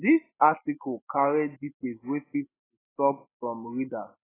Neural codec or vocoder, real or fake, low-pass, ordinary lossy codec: none; real; 3.6 kHz; AAC, 16 kbps